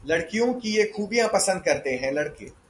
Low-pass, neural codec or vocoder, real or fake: 10.8 kHz; none; real